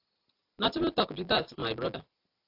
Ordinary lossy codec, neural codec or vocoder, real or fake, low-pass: AAC, 48 kbps; none; real; 5.4 kHz